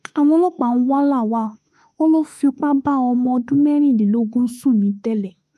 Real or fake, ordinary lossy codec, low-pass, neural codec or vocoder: fake; none; 14.4 kHz; autoencoder, 48 kHz, 32 numbers a frame, DAC-VAE, trained on Japanese speech